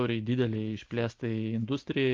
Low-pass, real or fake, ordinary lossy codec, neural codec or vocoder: 7.2 kHz; real; Opus, 32 kbps; none